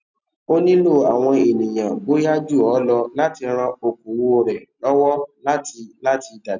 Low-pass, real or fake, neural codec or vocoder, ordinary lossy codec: 7.2 kHz; real; none; none